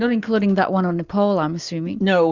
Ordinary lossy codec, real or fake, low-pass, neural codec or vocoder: Opus, 64 kbps; real; 7.2 kHz; none